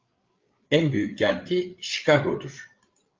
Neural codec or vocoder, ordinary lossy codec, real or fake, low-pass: codec, 16 kHz, 8 kbps, FreqCodec, larger model; Opus, 24 kbps; fake; 7.2 kHz